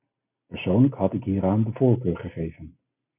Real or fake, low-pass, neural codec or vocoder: real; 3.6 kHz; none